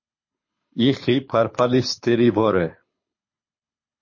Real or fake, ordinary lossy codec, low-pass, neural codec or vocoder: fake; MP3, 32 kbps; 7.2 kHz; codec, 24 kHz, 6 kbps, HILCodec